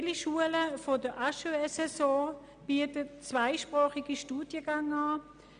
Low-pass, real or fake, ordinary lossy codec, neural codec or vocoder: 9.9 kHz; real; none; none